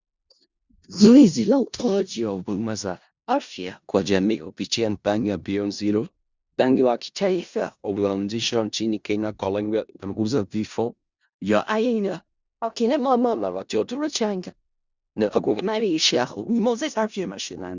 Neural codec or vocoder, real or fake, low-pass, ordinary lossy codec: codec, 16 kHz in and 24 kHz out, 0.4 kbps, LongCat-Audio-Codec, four codebook decoder; fake; 7.2 kHz; Opus, 64 kbps